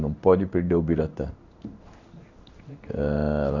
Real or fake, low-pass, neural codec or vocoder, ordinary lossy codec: fake; 7.2 kHz; codec, 16 kHz in and 24 kHz out, 1 kbps, XY-Tokenizer; none